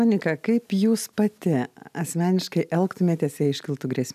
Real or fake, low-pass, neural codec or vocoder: real; 14.4 kHz; none